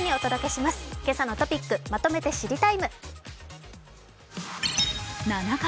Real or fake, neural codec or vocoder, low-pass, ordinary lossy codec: real; none; none; none